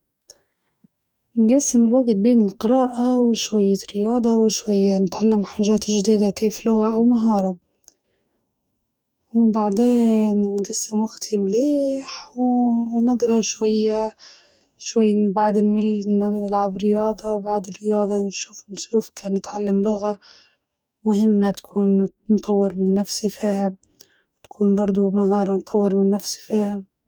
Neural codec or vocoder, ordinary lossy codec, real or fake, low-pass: codec, 44.1 kHz, 2.6 kbps, DAC; none; fake; 19.8 kHz